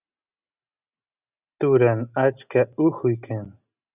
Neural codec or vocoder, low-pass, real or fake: none; 3.6 kHz; real